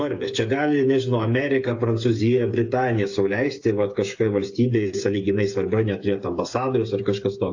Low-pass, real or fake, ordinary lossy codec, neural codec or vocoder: 7.2 kHz; fake; AAC, 48 kbps; codec, 16 kHz, 8 kbps, FreqCodec, smaller model